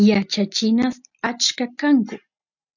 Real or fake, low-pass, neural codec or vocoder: real; 7.2 kHz; none